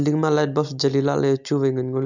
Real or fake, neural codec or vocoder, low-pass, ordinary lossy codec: real; none; 7.2 kHz; none